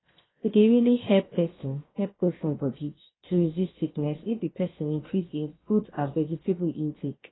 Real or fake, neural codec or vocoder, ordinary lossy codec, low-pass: fake; codec, 16 kHz, 0.7 kbps, FocalCodec; AAC, 16 kbps; 7.2 kHz